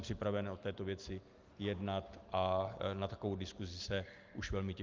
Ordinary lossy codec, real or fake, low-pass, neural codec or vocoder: Opus, 24 kbps; real; 7.2 kHz; none